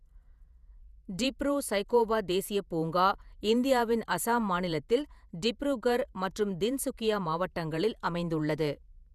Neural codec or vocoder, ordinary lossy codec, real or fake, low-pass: vocoder, 48 kHz, 128 mel bands, Vocos; none; fake; 14.4 kHz